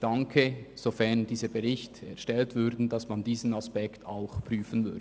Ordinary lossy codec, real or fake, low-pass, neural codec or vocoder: none; real; none; none